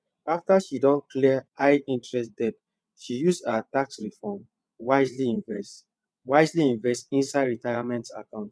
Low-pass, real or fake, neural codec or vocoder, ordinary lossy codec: none; fake; vocoder, 22.05 kHz, 80 mel bands, WaveNeXt; none